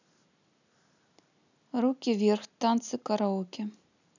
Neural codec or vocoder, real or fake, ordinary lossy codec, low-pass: none; real; none; 7.2 kHz